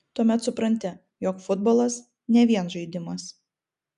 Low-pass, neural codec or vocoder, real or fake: 10.8 kHz; none; real